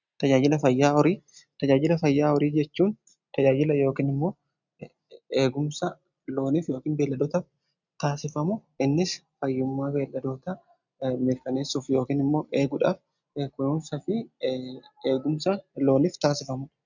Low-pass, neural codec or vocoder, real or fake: 7.2 kHz; none; real